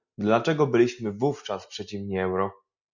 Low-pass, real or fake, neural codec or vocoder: 7.2 kHz; real; none